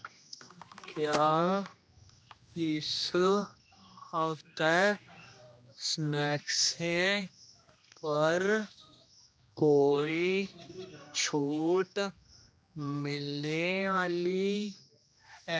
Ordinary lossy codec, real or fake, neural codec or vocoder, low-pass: none; fake; codec, 16 kHz, 1 kbps, X-Codec, HuBERT features, trained on general audio; none